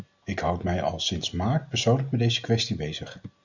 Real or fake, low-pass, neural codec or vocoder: real; 7.2 kHz; none